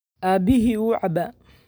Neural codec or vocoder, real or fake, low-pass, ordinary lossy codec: none; real; none; none